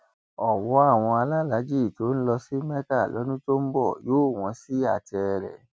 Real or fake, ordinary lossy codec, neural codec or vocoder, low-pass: real; none; none; none